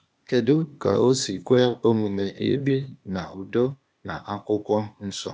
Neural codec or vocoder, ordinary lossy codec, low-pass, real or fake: codec, 16 kHz, 0.8 kbps, ZipCodec; none; none; fake